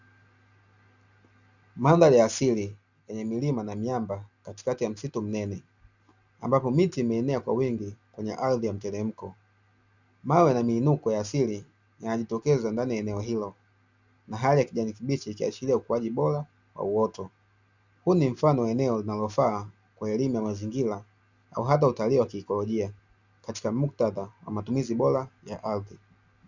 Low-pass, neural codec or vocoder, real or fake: 7.2 kHz; none; real